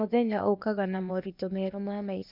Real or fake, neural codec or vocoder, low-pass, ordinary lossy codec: fake; codec, 16 kHz, 0.8 kbps, ZipCodec; 5.4 kHz; none